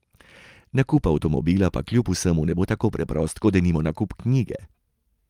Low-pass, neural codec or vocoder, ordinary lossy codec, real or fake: 19.8 kHz; none; Opus, 32 kbps; real